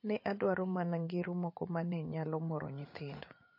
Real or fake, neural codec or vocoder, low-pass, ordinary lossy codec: fake; vocoder, 44.1 kHz, 80 mel bands, Vocos; 5.4 kHz; MP3, 32 kbps